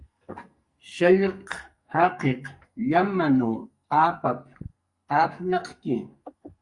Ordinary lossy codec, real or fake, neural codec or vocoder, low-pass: Opus, 64 kbps; fake; codec, 44.1 kHz, 2.6 kbps, SNAC; 10.8 kHz